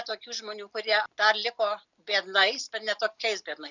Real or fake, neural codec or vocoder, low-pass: real; none; 7.2 kHz